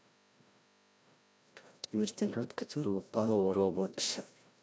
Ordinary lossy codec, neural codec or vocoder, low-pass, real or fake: none; codec, 16 kHz, 0.5 kbps, FreqCodec, larger model; none; fake